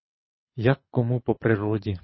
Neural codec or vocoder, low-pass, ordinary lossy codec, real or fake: codec, 24 kHz, 6 kbps, HILCodec; 7.2 kHz; MP3, 24 kbps; fake